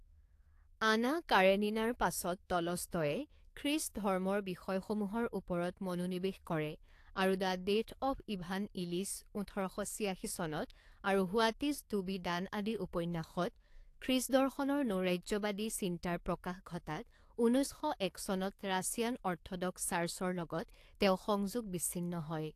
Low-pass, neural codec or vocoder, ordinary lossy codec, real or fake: 14.4 kHz; codec, 44.1 kHz, 7.8 kbps, DAC; AAC, 64 kbps; fake